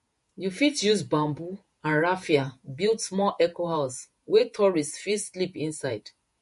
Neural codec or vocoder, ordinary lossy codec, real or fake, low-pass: vocoder, 48 kHz, 128 mel bands, Vocos; MP3, 48 kbps; fake; 14.4 kHz